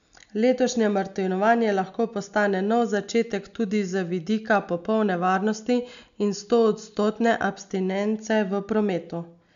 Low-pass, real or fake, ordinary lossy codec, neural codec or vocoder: 7.2 kHz; real; none; none